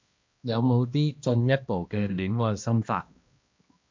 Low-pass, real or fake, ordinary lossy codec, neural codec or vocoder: 7.2 kHz; fake; MP3, 64 kbps; codec, 16 kHz, 1 kbps, X-Codec, HuBERT features, trained on balanced general audio